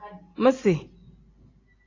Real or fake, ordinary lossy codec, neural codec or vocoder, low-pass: real; AAC, 32 kbps; none; 7.2 kHz